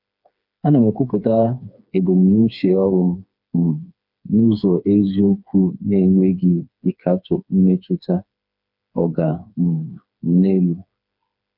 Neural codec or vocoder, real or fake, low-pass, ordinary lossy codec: codec, 16 kHz, 4 kbps, FreqCodec, smaller model; fake; 5.4 kHz; none